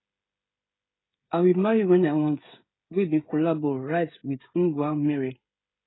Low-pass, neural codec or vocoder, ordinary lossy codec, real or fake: 7.2 kHz; codec, 16 kHz, 16 kbps, FreqCodec, smaller model; AAC, 16 kbps; fake